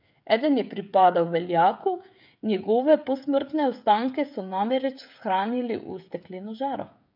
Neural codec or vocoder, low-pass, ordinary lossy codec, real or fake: codec, 16 kHz, 16 kbps, FreqCodec, smaller model; 5.4 kHz; none; fake